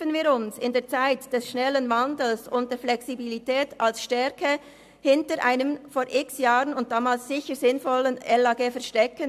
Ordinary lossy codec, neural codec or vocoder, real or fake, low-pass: AAC, 96 kbps; none; real; 14.4 kHz